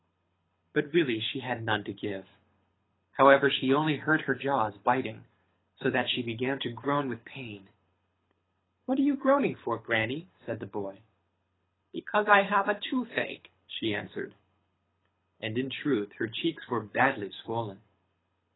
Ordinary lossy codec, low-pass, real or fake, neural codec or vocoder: AAC, 16 kbps; 7.2 kHz; fake; codec, 24 kHz, 6 kbps, HILCodec